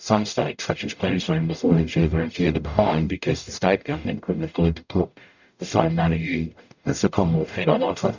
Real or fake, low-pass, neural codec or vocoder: fake; 7.2 kHz; codec, 44.1 kHz, 0.9 kbps, DAC